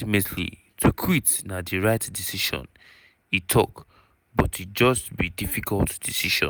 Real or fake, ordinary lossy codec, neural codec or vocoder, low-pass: real; none; none; none